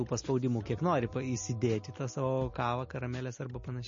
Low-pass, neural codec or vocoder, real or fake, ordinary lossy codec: 7.2 kHz; none; real; MP3, 32 kbps